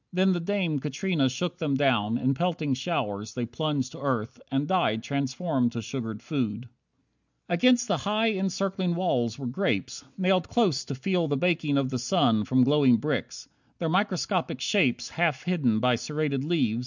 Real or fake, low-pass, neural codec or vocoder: real; 7.2 kHz; none